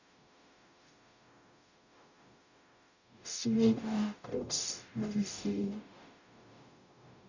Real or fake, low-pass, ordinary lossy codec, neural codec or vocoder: fake; 7.2 kHz; none; codec, 44.1 kHz, 0.9 kbps, DAC